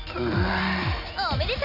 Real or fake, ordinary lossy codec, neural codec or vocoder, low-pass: real; none; none; 5.4 kHz